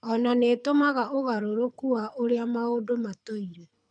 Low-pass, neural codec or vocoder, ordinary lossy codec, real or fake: 9.9 kHz; codec, 24 kHz, 6 kbps, HILCodec; MP3, 96 kbps; fake